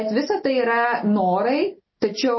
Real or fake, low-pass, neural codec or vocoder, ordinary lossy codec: real; 7.2 kHz; none; MP3, 24 kbps